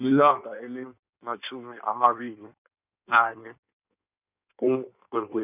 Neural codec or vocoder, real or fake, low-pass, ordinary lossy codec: codec, 16 kHz in and 24 kHz out, 1.1 kbps, FireRedTTS-2 codec; fake; 3.6 kHz; none